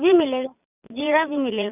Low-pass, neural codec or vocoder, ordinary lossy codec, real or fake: 3.6 kHz; vocoder, 22.05 kHz, 80 mel bands, Vocos; none; fake